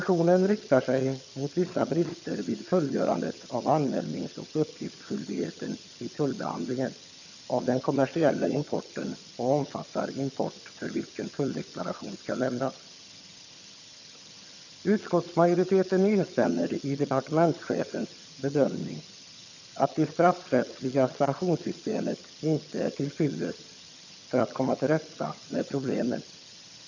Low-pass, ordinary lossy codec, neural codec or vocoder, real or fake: 7.2 kHz; none; vocoder, 22.05 kHz, 80 mel bands, HiFi-GAN; fake